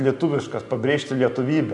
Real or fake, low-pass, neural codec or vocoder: real; 10.8 kHz; none